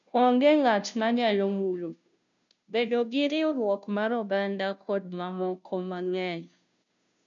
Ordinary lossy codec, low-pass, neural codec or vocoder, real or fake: none; 7.2 kHz; codec, 16 kHz, 0.5 kbps, FunCodec, trained on Chinese and English, 25 frames a second; fake